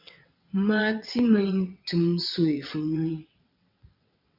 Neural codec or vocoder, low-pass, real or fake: vocoder, 22.05 kHz, 80 mel bands, WaveNeXt; 5.4 kHz; fake